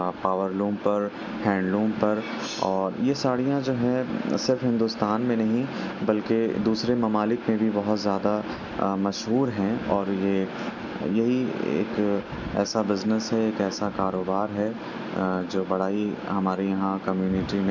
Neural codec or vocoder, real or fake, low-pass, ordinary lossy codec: none; real; 7.2 kHz; none